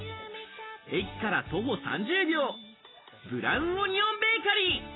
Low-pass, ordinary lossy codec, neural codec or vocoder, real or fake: 7.2 kHz; AAC, 16 kbps; none; real